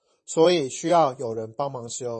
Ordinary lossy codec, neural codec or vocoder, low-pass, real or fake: MP3, 32 kbps; vocoder, 44.1 kHz, 128 mel bands, Pupu-Vocoder; 10.8 kHz; fake